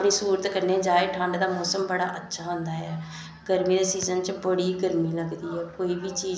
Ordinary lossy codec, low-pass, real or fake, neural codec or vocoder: none; none; real; none